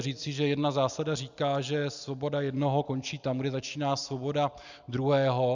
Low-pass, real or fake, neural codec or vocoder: 7.2 kHz; real; none